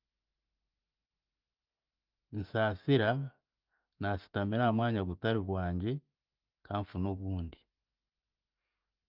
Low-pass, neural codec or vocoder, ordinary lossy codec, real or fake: 5.4 kHz; none; Opus, 32 kbps; real